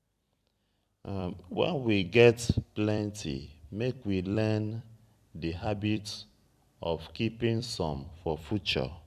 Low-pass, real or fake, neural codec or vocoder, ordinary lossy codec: 14.4 kHz; fake; vocoder, 44.1 kHz, 128 mel bands every 256 samples, BigVGAN v2; none